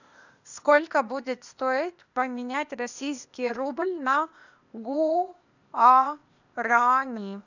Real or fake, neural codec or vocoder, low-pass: fake; codec, 16 kHz, 0.8 kbps, ZipCodec; 7.2 kHz